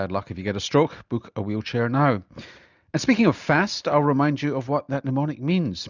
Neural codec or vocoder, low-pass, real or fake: none; 7.2 kHz; real